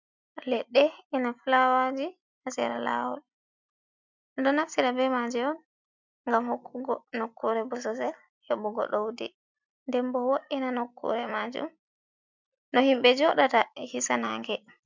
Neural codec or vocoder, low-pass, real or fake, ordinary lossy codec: none; 7.2 kHz; real; MP3, 64 kbps